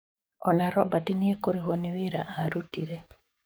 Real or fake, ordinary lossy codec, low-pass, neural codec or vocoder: fake; none; none; codec, 44.1 kHz, 7.8 kbps, DAC